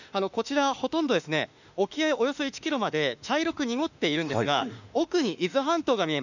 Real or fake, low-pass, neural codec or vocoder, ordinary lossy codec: fake; 7.2 kHz; autoencoder, 48 kHz, 32 numbers a frame, DAC-VAE, trained on Japanese speech; none